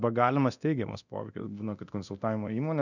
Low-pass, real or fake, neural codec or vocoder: 7.2 kHz; fake; codec, 24 kHz, 0.9 kbps, DualCodec